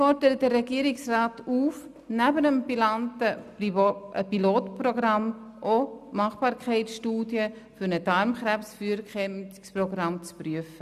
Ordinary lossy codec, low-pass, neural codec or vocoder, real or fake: none; 14.4 kHz; none; real